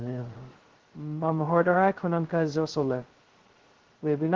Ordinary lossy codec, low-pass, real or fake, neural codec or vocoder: Opus, 16 kbps; 7.2 kHz; fake; codec, 16 kHz, 0.2 kbps, FocalCodec